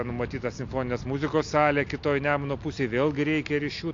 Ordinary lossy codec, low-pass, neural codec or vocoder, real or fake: AAC, 48 kbps; 7.2 kHz; none; real